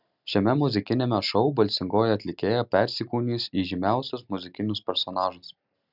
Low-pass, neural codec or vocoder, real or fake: 5.4 kHz; none; real